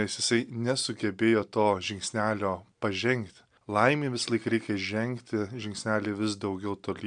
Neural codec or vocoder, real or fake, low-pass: none; real; 9.9 kHz